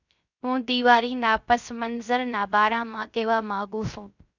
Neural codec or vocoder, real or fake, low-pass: codec, 16 kHz, 0.3 kbps, FocalCodec; fake; 7.2 kHz